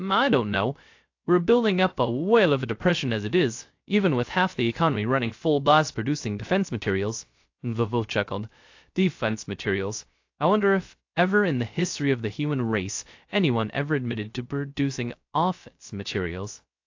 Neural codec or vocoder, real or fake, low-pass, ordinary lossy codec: codec, 16 kHz, 0.3 kbps, FocalCodec; fake; 7.2 kHz; AAC, 48 kbps